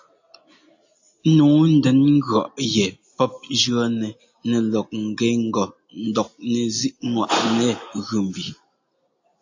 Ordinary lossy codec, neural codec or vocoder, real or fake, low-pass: AAC, 48 kbps; none; real; 7.2 kHz